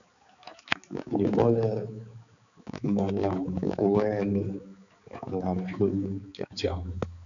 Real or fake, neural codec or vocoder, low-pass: fake; codec, 16 kHz, 4 kbps, X-Codec, HuBERT features, trained on balanced general audio; 7.2 kHz